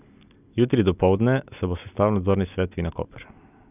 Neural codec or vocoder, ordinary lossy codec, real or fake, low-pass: none; none; real; 3.6 kHz